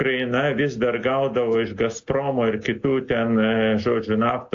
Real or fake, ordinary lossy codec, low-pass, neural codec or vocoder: real; AAC, 48 kbps; 7.2 kHz; none